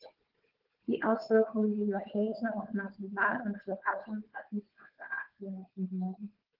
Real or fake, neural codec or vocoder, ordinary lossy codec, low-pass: fake; codec, 16 kHz, 4 kbps, FunCodec, trained on Chinese and English, 50 frames a second; Opus, 16 kbps; 5.4 kHz